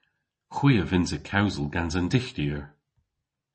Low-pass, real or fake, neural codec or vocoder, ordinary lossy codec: 10.8 kHz; real; none; MP3, 32 kbps